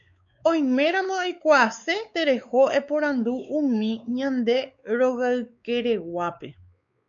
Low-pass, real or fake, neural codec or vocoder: 7.2 kHz; fake; codec, 16 kHz, 4 kbps, X-Codec, WavLM features, trained on Multilingual LibriSpeech